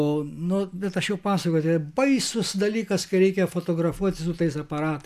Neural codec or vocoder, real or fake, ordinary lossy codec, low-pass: none; real; AAC, 96 kbps; 14.4 kHz